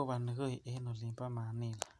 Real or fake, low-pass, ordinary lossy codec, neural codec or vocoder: real; none; none; none